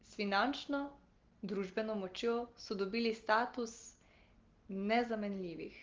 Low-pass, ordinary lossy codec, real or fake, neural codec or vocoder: 7.2 kHz; Opus, 16 kbps; real; none